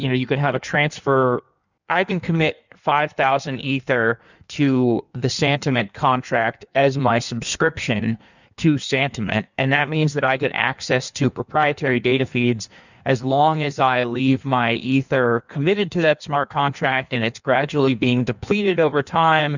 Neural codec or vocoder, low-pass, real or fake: codec, 16 kHz in and 24 kHz out, 1.1 kbps, FireRedTTS-2 codec; 7.2 kHz; fake